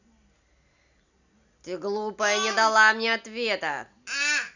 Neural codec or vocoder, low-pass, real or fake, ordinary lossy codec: none; 7.2 kHz; real; none